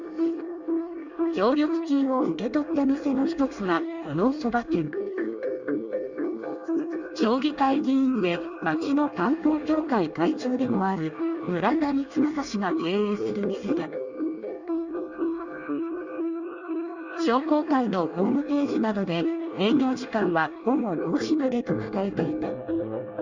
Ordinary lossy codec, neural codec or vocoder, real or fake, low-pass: Opus, 64 kbps; codec, 24 kHz, 1 kbps, SNAC; fake; 7.2 kHz